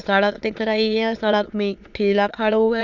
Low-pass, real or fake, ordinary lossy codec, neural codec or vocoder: 7.2 kHz; fake; Opus, 64 kbps; autoencoder, 22.05 kHz, a latent of 192 numbers a frame, VITS, trained on many speakers